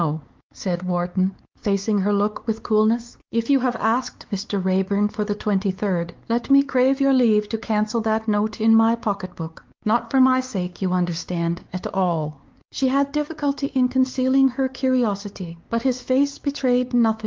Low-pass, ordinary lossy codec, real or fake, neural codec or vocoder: 7.2 kHz; Opus, 32 kbps; fake; codec, 16 kHz, 4 kbps, X-Codec, WavLM features, trained on Multilingual LibriSpeech